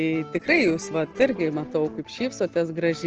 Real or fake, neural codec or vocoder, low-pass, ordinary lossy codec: real; none; 7.2 kHz; Opus, 16 kbps